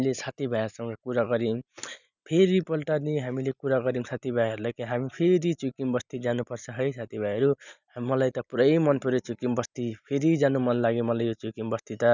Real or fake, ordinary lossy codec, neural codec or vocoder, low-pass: real; none; none; 7.2 kHz